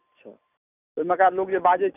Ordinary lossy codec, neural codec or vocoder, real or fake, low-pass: none; none; real; 3.6 kHz